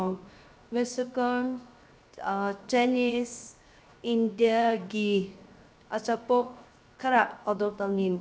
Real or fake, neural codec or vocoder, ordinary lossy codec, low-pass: fake; codec, 16 kHz, 0.7 kbps, FocalCodec; none; none